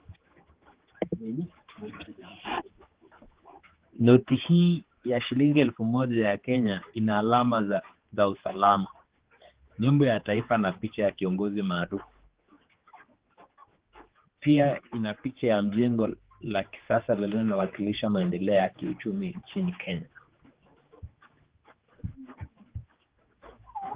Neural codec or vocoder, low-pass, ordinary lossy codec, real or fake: codec, 16 kHz, 4 kbps, X-Codec, HuBERT features, trained on general audio; 3.6 kHz; Opus, 16 kbps; fake